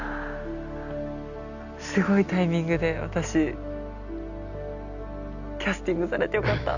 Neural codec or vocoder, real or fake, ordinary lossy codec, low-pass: none; real; none; 7.2 kHz